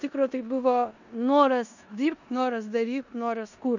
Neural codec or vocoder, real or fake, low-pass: codec, 16 kHz in and 24 kHz out, 0.9 kbps, LongCat-Audio-Codec, four codebook decoder; fake; 7.2 kHz